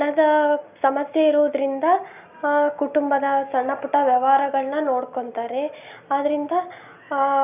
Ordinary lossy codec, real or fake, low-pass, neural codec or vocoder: none; real; 3.6 kHz; none